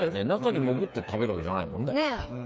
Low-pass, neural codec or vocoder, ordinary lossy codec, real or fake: none; codec, 16 kHz, 4 kbps, FreqCodec, smaller model; none; fake